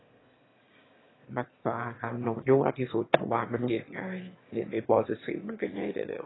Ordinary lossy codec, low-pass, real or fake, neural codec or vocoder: AAC, 16 kbps; 7.2 kHz; fake; autoencoder, 22.05 kHz, a latent of 192 numbers a frame, VITS, trained on one speaker